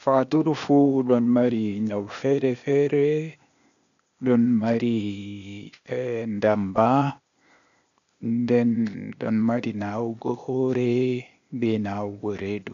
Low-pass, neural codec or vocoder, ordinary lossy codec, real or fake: 7.2 kHz; codec, 16 kHz, 0.8 kbps, ZipCodec; none; fake